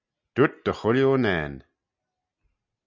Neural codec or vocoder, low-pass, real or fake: none; 7.2 kHz; real